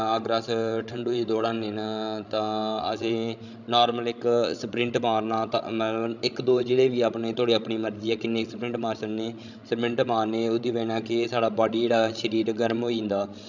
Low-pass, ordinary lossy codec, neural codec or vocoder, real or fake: 7.2 kHz; none; codec, 16 kHz, 16 kbps, FreqCodec, larger model; fake